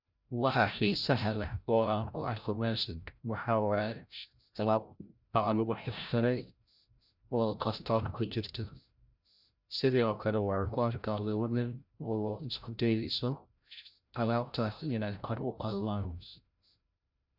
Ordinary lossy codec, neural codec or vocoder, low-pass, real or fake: none; codec, 16 kHz, 0.5 kbps, FreqCodec, larger model; 5.4 kHz; fake